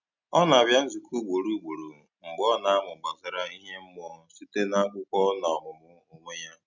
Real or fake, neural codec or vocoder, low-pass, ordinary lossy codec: real; none; 7.2 kHz; none